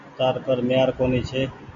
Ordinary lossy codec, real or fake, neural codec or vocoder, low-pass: MP3, 96 kbps; real; none; 7.2 kHz